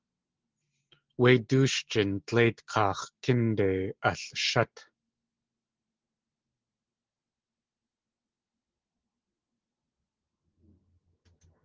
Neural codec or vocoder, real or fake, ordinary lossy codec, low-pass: none; real; Opus, 16 kbps; 7.2 kHz